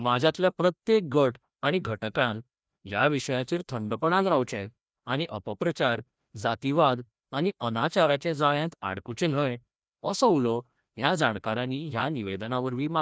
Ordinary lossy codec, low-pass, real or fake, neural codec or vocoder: none; none; fake; codec, 16 kHz, 1 kbps, FreqCodec, larger model